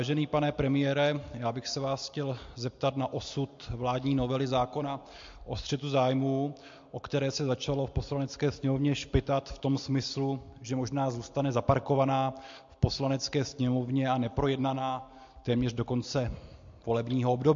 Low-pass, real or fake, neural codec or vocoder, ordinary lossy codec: 7.2 kHz; real; none; MP3, 48 kbps